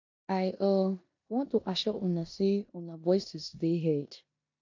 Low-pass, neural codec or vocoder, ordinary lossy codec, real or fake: 7.2 kHz; codec, 16 kHz in and 24 kHz out, 0.9 kbps, LongCat-Audio-Codec, four codebook decoder; AAC, 48 kbps; fake